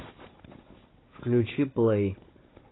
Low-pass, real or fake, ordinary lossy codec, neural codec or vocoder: 7.2 kHz; fake; AAC, 16 kbps; codec, 16 kHz, 16 kbps, FunCodec, trained on Chinese and English, 50 frames a second